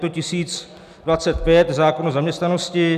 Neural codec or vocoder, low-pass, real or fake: none; 14.4 kHz; real